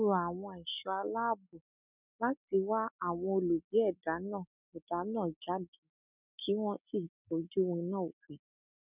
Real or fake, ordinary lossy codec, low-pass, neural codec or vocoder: real; none; 3.6 kHz; none